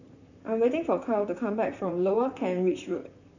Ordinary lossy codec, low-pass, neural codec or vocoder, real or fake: none; 7.2 kHz; vocoder, 44.1 kHz, 128 mel bands, Pupu-Vocoder; fake